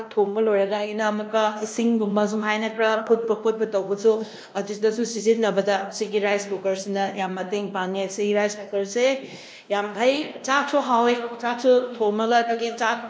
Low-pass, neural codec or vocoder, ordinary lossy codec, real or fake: none; codec, 16 kHz, 1 kbps, X-Codec, WavLM features, trained on Multilingual LibriSpeech; none; fake